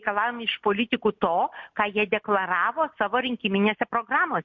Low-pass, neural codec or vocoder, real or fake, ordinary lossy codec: 7.2 kHz; none; real; MP3, 48 kbps